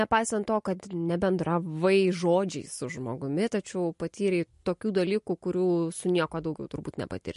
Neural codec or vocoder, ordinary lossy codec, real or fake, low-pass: none; MP3, 48 kbps; real; 14.4 kHz